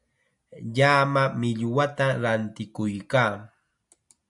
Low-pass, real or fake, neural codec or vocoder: 10.8 kHz; real; none